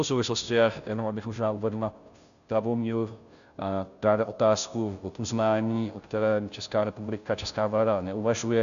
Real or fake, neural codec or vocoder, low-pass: fake; codec, 16 kHz, 0.5 kbps, FunCodec, trained on Chinese and English, 25 frames a second; 7.2 kHz